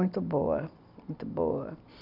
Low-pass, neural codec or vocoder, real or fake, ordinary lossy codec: 5.4 kHz; none; real; none